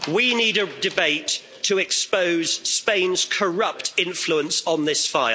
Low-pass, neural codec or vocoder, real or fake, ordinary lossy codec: none; none; real; none